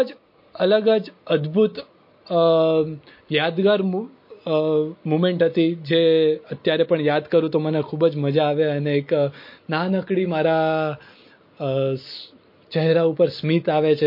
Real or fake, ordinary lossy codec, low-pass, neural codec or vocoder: real; MP3, 32 kbps; 5.4 kHz; none